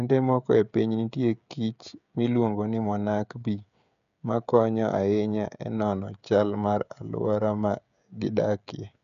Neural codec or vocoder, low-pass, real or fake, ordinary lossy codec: codec, 16 kHz, 16 kbps, FreqCodec, smaller model; 7.2 kHz; fake; none